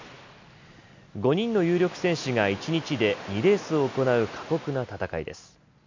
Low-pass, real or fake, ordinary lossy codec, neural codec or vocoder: 7.2 kHz; real; MP3, 64 kbps; none